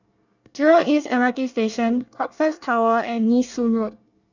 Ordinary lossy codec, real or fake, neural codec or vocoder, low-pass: none; fake; codec, 24 kHz, 1 kbps, SNAC; 7.2 kHz